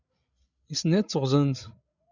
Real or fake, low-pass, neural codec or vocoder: fake; 7.2 kHz; codec, 16 kHz, 16 kbps, FreqCodec, larger model